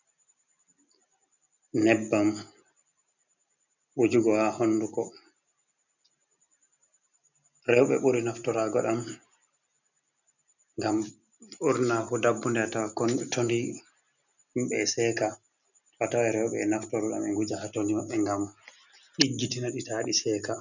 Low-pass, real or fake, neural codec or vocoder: 7.2 kHz; real; none